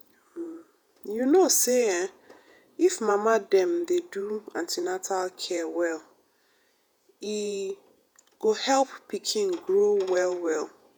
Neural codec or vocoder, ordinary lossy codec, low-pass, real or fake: vocoder, 48 kHz, 128 mel bands, Vocos; none; none; fake